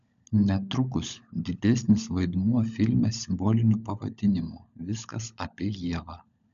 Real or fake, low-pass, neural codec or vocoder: fake; 7.2 kHz; codec, 16 kHz, 16 kbps, FunCodec, trained on LibriTTS, 50 frames a second